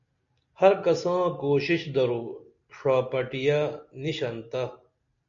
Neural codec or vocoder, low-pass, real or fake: none; 7.2 kHz; real